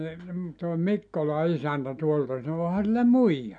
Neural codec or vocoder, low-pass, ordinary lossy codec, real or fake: none; 9.9 kHz; none; real